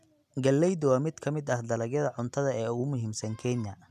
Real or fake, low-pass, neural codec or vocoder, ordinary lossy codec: real; 14.4 kHz; none; none